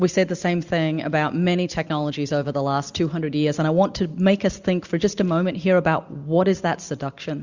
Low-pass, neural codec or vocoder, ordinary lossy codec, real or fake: 7.2 kHz; none; Opus, 64 kbps; real